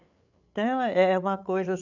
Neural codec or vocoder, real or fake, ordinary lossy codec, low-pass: codec, 16 kHz, 8 kbps, FreqCodec, larger model; fake; none; 7.2 kHz